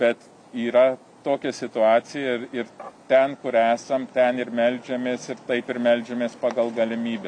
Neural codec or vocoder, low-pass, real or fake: none; 9.9 kHz; real